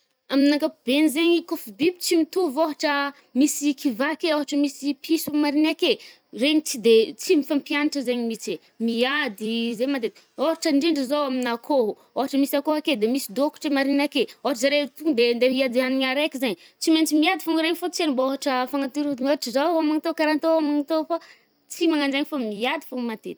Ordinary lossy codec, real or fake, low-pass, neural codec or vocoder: none; fake; none; vocoder, 44.1 kHz, 128 mel bands every 256 samples, BigVGAN v2